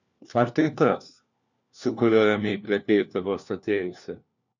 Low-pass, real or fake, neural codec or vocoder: 7.2 kHz; fake; codec, 16 kHz, 1 kbps, FunCodec, trained on LibriTTS, 50 frames a second